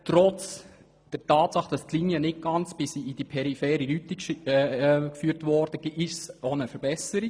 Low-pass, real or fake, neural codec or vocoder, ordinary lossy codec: none; real; none; none